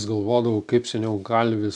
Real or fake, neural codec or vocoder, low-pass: real; none; 10.8 kHz